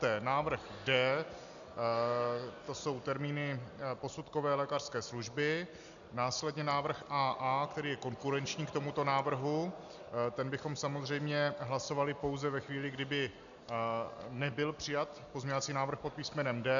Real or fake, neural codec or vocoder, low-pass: real; none; 7.2 kHz